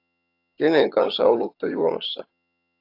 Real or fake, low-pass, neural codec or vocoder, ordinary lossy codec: fake; 5.4 kHz; vocoder, 22.05 kHz, 80 mel bands, HiFi-GAN; AAC, 32 kbps